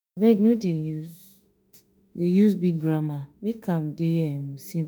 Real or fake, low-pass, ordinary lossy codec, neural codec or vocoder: fake; none; none; autoencoder, 48 kHz, 32 numbers a frame, DAC-VAE, trained on Japanese speech